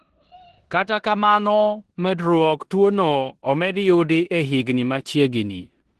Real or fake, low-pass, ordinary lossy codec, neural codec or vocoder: fake; 10.8 kHz; Opus, 16 kbps; codec, 16 kHz in and 24 kHz out, 0.9 kbps, LongCat-Audio-Codec, four codebook decoder